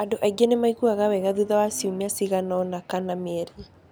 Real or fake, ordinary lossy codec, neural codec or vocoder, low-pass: real; none; none; none